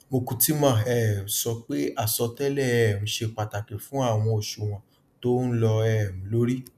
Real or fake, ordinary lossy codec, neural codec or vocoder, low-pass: real; none; none; 14.4 kHz